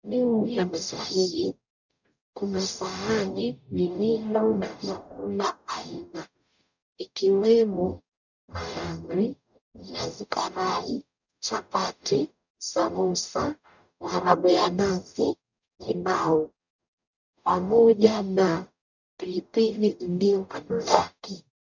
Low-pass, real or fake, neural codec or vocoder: 7.2 kHz; fake; codec, 44.1 kHz, 0.9 kbps, DAC